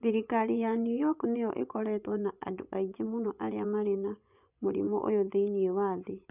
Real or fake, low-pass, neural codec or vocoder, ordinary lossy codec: fake; 3.6 kHz; vocoder, 24 kHz, 100 mel bands, Vocos; none